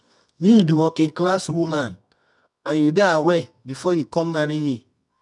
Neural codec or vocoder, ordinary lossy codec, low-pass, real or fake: codec, 24 kHz, 0.9 kbps, WavTokenizer, medium music audio release; none; 10.8 kHz; fake